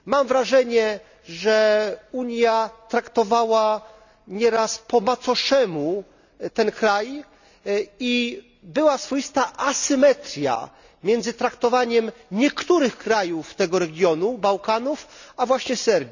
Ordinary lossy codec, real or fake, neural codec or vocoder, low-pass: none; real; none; 7.2 kHz